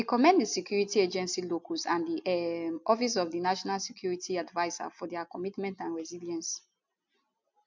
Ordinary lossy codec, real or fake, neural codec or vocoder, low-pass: MP3, 64 kbps; real; none; 7.2 kHz